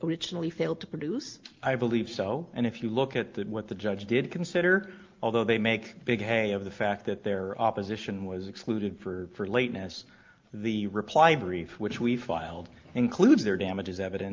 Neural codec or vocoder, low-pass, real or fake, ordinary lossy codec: none; 7.2 kHz; real; Opus, 24 kbps